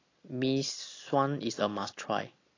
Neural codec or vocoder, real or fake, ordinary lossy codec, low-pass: none; real; AAC, 32 kbps; 7.2 kHz